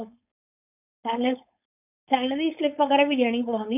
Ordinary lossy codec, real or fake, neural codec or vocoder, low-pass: none; fake; codec, 16 kHz, 4.8 kbps, FACodec; 3.6 kHz